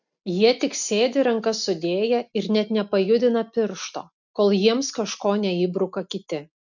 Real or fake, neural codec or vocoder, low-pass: real; none; 7.2 kHz